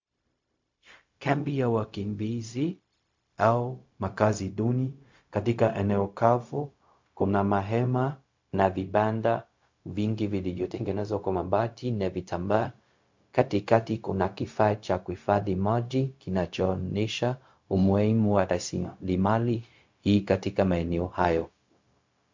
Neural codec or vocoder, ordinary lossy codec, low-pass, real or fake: codec, 16 kHz, 0.4 kbps, LongCat-Audio-Codec; MP3, 48 kbps; 7.2 kHz; fake